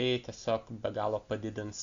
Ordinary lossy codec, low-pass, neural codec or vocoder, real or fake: Opus, 64 kbps; 7.2 kHz; none; real